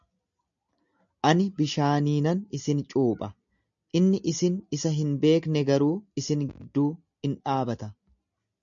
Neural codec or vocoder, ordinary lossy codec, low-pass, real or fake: none; MP3, 64 kbps; 7.2 kHz; real